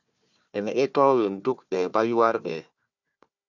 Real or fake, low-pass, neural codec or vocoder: fake; 7.2 kHz; codec, 16 kHz, 1 kbps, FunCodec, trained on Chinese and English, 50 frames a second